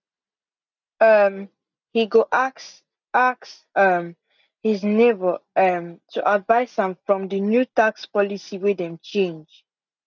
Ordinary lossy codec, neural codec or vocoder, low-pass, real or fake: none; none; 7.2 kHz; real